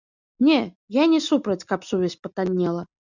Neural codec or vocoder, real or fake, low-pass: none; real; 7.2 kHz